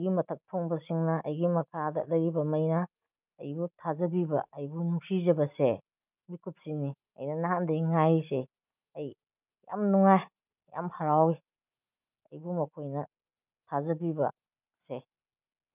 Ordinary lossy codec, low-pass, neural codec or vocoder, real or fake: none; 3.6 kHz; none; real